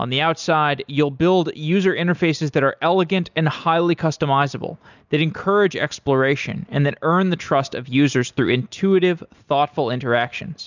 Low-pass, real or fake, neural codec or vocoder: 7.2 kHz; real; none